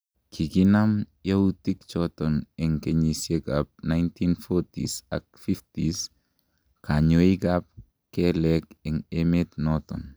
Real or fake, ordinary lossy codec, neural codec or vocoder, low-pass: real; none; none; none